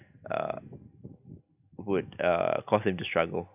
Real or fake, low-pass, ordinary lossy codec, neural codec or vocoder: real; 3.6 kHz; none; none